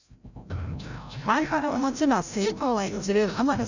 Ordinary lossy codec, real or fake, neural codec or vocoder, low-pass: none; fake; codec, 16 kHz, 0.5 kbps, FreqCodec, larger model; 7.2 kHz